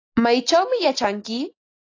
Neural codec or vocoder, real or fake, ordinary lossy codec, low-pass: none; real; AAC, 32 kbps; 7.2 kHz